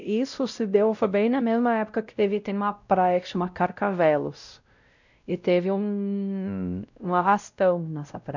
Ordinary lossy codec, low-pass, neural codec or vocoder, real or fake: none; 7.2 kHz; codec, 16 kHz, 0.5 kbps, X-Codec, WavLM features, trained on Multilingual LibriSpeech; fake